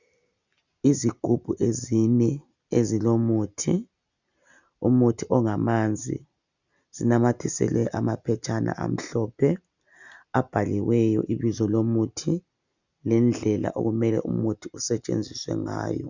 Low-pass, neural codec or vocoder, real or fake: 7.2 kHz; none; real